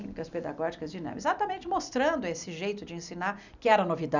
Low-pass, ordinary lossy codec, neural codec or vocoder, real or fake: 7.2 kHz; none; none; real